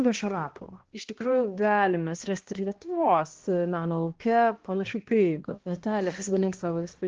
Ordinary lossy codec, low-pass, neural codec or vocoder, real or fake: Opus, 16 kbps; 7.2 kHz; codec, 16 kHz, 1 kbps, X-Codec, HuBERT features, trained on balanced general audio; fake